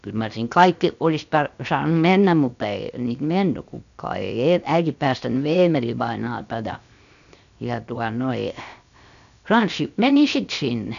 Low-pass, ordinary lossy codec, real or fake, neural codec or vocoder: 7.2 kHz; none; fake; codec, 16 kHz, 0.7 kbps, FocalCodec